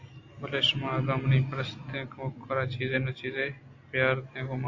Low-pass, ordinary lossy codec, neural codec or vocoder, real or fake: 7.2 kHz; AAC, 48 kbps; none; real